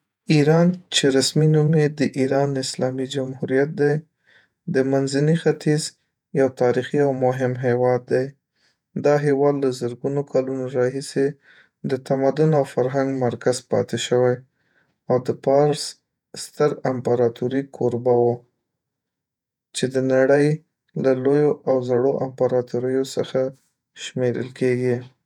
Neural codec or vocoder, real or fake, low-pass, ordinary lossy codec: vocoder, 48 kHz, 128 mel bands, Vocos; fake; 19.8 kHz; none